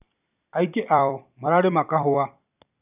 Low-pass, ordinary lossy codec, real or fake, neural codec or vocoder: 3.6 kHz; none; fake; vocoder, 44.1 kHz, 128 mel bands every 256 samples, BigVGAN v2